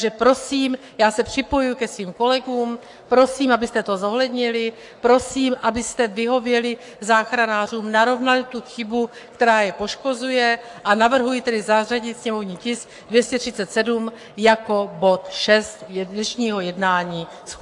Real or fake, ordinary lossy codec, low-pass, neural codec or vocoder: fake; AAC, 64 kbps; 10.8 kHz; codec, 44.1 kHz, 7.8 kbps, Pupu-Codec